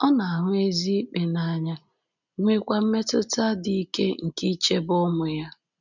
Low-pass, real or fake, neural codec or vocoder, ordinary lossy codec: 7.2 kHz; real; none; none